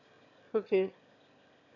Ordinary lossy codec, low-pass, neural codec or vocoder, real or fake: none; 7.2 kHz; autoencoder, 22.05 kHz, a latent of 192 numbers a frame, VITS, trained on one speaker; fake